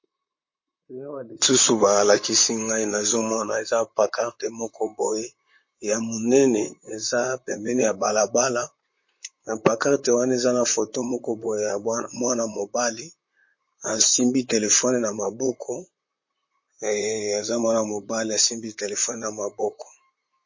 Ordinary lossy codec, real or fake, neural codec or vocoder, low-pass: MP3, 32 kbps; fake; vocoder, 44.1 kHz, 128 mel bands, Pupu-Vocoder; 7.2 kHz